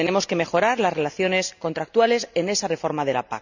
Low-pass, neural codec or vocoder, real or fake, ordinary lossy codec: 7.2 kHz; none; real; none